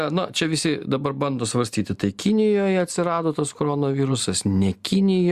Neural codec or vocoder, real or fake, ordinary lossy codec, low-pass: none; real; Opus, 64 kbps; 14.4 kHz